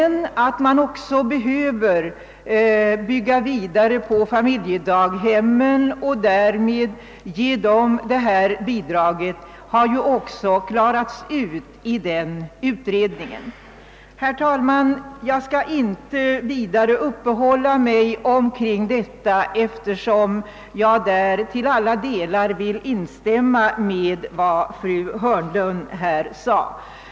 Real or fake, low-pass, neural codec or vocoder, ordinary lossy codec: real; none; none; none